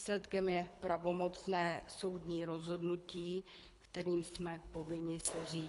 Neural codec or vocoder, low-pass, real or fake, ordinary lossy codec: codec, 24 kHz, 3 kbps, HILCodec; 10.8 kHz; fake; AAC, 64 kbps